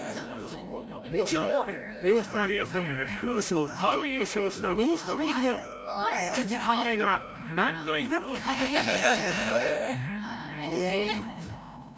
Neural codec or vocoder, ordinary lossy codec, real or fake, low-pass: codec, 16 kHz, 0.5 kbps, FreqCodec, larger model; none; fake; none